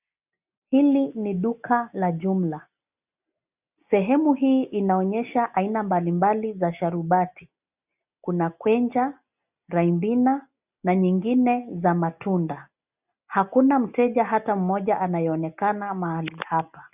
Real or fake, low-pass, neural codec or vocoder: real; 3.6 kHz; none